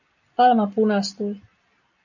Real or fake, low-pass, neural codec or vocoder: real; 7.2 kHz; none